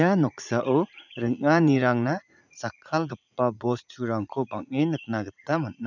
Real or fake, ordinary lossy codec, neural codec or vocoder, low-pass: fake; none; vocoder, 44.1 kHz, 80 mel bands, Vocos; 7.2 kHz